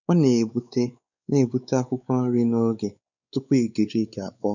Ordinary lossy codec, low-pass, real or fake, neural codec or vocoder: none; 7.2 kHz; fake; codec, 16 kHz, 4 kbps, X-Codec, WavLM features, trained on Multilingual LibriSpeech